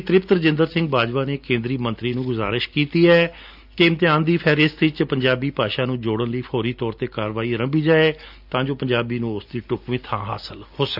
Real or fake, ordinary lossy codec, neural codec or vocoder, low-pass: real; AAC, 48 kbps; none; 5.4 kHz